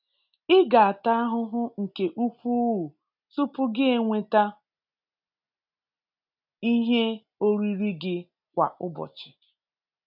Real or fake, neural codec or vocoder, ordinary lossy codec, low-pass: real; none; none; 5.4 kHz